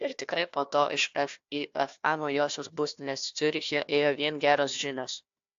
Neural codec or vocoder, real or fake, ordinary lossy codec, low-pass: codec, 16 kHz, 1 kbps, FunCodec, trained on Chinese and English, 50 frames a second; fake; AAC, 64 kbps; 7.2 kHz